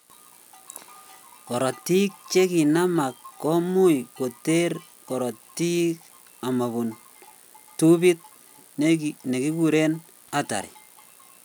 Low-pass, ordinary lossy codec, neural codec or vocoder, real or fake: none; none; none; real